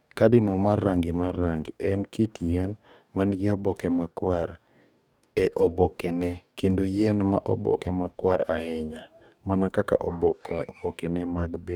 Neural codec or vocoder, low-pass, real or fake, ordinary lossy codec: codec, 44.1 kHz, 2.6 kbps, DAC; 19.8 kHz; fake; none